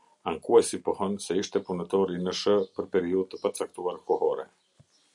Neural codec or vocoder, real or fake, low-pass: none; real; 10.8 kHz